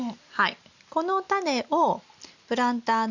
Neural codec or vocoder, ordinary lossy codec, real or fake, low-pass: none; Opus, 64 kbps; real; 7.2 kHz